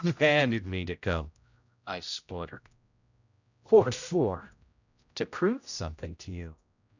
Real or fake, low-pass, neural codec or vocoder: fake; 7.2 kHz; codec, 16 kHz, 0.5 kbps, X-Codec, HuBERT features, trained on general audio